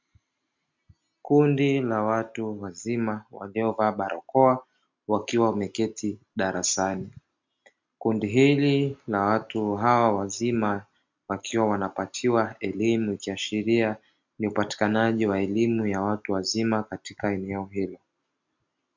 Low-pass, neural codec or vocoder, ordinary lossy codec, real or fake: 7.2 kHz; none; MP3, 64 kbps; real